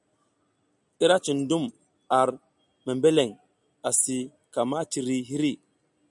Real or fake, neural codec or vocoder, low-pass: real; none; 10.8 kHz